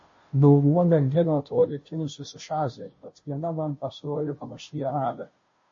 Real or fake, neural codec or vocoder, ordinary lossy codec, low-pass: fake; codec, 16 kHz, 0.5 kbps, FunCodec, trained on Chinese and English, 25 frames a second; MP3, 32 kbps; 7.2 kHz